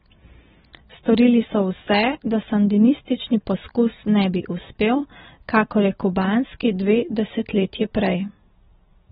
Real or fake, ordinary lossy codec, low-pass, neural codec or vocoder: real; AAC, 16 kbps; 7.2 kHz; none